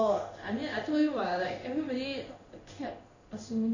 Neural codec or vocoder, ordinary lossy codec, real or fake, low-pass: codec, 16 kHz in and 24 kHz out, 1 kbps, XY-Tokenizer; none; fake; 7.2 kHz